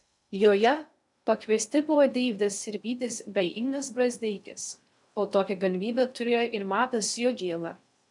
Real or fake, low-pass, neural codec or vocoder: fake; 10.8 kHz; codec, 16 kHz in and 24 kHz out, 0.6 kbps, FocalCodec, streaming, 2048 codes